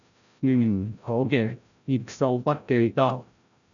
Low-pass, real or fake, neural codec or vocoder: 7.2 kHz; fake; codec, 16 kHz, 0.5 kbps, FreqCodec, larger model